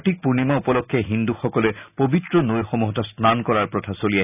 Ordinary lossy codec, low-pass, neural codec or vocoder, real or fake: none; 3.6 kHz; none; real